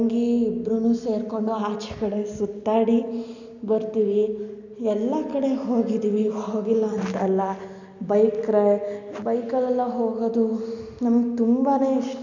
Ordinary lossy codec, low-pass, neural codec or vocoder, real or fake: none; 7.2 kHz; none; real